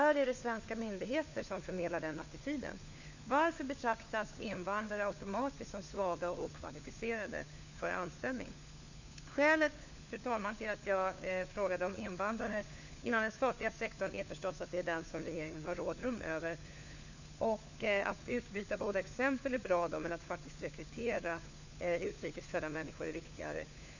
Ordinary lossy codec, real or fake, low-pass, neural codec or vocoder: none; fake; 7.2 kHz; codec, 16 kHz, 4 kbps, FunCodec, trained on LibriTTS, 50 frames a second